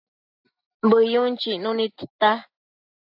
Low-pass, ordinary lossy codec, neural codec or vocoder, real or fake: 5.4 kHz; AAC, 48 kbps; none; real